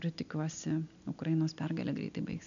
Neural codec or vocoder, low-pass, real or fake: none; 7.2 kHz; real